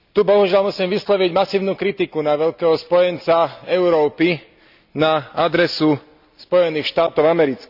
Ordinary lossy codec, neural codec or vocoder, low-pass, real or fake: none; none; 5.4 kHz; real